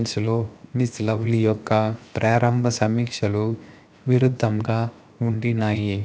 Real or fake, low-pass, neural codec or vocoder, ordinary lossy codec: fake; none; codec, 16 kHz, 0.7 kbps, FocalCodec; none